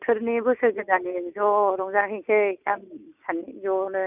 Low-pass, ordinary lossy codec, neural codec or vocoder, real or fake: 3.6 kHz; none; none; real